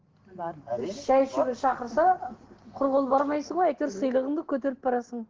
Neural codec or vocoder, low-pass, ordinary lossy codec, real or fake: none; 7.2 kHz; Opus, 16 kbps; real